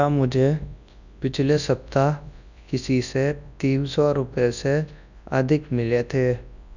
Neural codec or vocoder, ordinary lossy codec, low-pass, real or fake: codec, 24 kHz, 0.9 kbps, WavTokenizer, large speech release; none; 7.2 kHz; fake